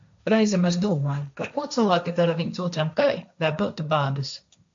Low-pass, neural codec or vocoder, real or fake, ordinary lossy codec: 7.2 kHz; codec, 16 kHz, 1.1 kbps, Voila-Tokenizer; fake; AAC, 64 kbps